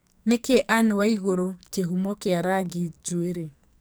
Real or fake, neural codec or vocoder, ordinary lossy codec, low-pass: fake; codec, 44.1 kHz, 2.6 kbps, SNAC; none; none